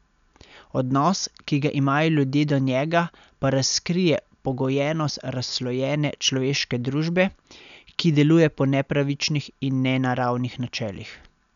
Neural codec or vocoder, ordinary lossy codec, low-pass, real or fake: none; none; 7.2 kHz; real